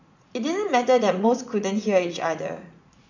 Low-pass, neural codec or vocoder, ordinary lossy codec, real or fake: 7.2 kHz; vocoder, 44.1 kHz, 80 mel bands, Vocos; none; fake